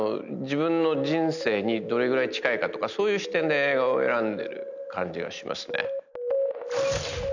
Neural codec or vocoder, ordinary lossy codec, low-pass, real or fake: none; none; 7.2 kHz; real